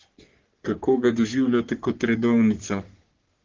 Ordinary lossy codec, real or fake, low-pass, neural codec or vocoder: Opus, 16 kbps; fake; 7.2 kHz; codec, 44.1 kHz, 3.4 kbps, Pupu-Codec